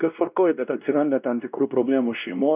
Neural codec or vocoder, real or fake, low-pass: codec, 16 kHz, 1 kbps, X-Codec, WavLM features, trained on Multilingual LibriSpeech; fake; 3.6 kHz